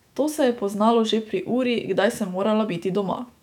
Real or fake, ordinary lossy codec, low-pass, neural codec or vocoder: fake; none; 19.8 kHz; autoencoder, 48 kHz, 128 numbers a frame, DAC-VAE, trained on Japanese speech